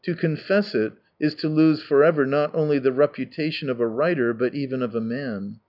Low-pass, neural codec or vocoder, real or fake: 5.4 kHz; none; real